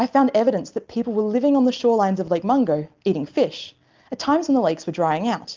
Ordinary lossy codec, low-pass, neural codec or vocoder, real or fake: Opus, 32 kbps; 7.2 kHz; none; real